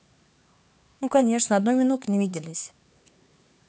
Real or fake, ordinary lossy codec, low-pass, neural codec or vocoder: fake; none; none; codec, 16 kHz, 4 kbps, X-Codec, HuBERT features, trained on LibriSpeech